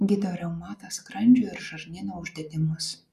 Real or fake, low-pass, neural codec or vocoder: real; 14.4 kHz; none